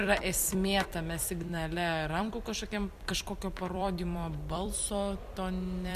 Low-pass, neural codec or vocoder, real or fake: 14.4 kHz; vocoder, 44.1 kHz, 128 mel bands every 256 samples, BigVGAN v2; fake